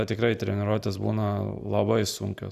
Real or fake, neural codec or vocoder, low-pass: fake; vocoder, 44.1 kHz, 128 mel bands every 512 samples, BigVGAN v2; 14.4 kHz